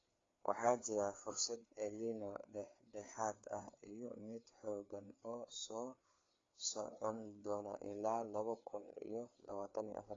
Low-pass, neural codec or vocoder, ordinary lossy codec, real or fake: 7.2 kHz; codec, 16 kHz, 4 kbps, FreqCodec, larger model; AAC, 32 kbps; fake